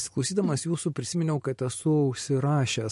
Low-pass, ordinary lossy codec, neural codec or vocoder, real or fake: 14.4 kHz; MP3, 48 kbps; vocoder, 44.1 kHz, 128 mel bands every 512 samples, BigVGAN v2; fake